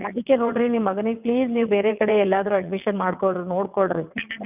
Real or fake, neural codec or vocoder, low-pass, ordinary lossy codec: fake; vocoder, 22.05 kHz, 80 mel bands, WaveNeXt; 3.6 kHz; none